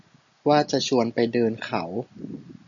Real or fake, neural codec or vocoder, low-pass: real; none; 7.2 kHz